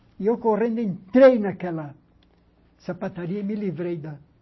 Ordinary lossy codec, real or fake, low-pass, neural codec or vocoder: MP3, 24 kbps; real; 7.2 kHz; none